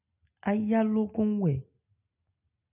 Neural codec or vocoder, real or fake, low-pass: none; real; 3.6 kHz